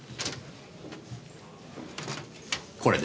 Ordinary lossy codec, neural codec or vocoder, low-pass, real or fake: none; none; none; real